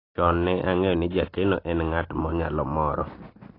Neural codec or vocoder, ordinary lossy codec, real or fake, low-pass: vocoder, 44.1 kHz, 128 mel bands every 256 samples, BigVGAN v2; AAC, 24 kbps; fake; 5.4 kHz